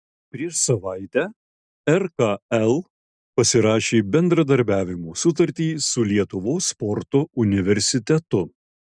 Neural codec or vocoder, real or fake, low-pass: none; real; 9.9 kHz